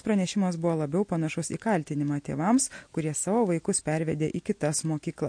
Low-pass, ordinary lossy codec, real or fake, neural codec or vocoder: 9.9 kHz; MP3, 48 kbps; real; none